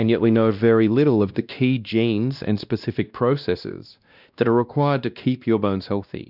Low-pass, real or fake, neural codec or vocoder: 5.4 kHz; fake; codec, 16 kHz, 1 kbps, X-Codec, WavLM features, trained on Multilingual LibriSpeech